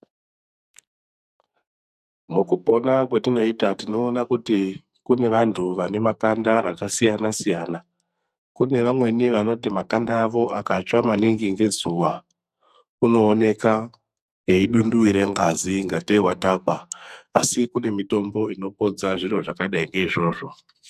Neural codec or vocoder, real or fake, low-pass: codec, 44.1 kHz, 2.6 kbps, SNAC; fake; 14.4 kHz